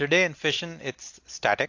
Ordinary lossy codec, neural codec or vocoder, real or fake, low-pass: AAC, 48 kbps; none; real; 7.2 kHz